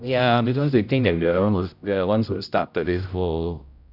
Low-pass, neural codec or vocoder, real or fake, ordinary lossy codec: 5.4 kHz; codec, 16 kHz, 0.5 kbps, X-Codec, HuBERT features, trained on general audio; fake; none